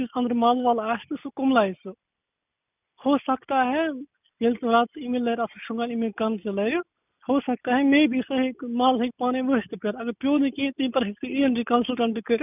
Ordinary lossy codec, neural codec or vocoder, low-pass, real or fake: none; none; 3.6 kHz; real